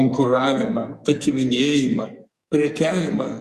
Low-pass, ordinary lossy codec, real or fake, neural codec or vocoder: 14.4 kHz; Opus, 64 kbps; fake; codec, 44.1 kHz, 2.6 kbps, SNAC